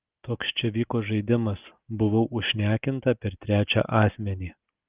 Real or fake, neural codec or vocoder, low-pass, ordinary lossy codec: real; none; 3.6 kHz; Opus, 32 kbps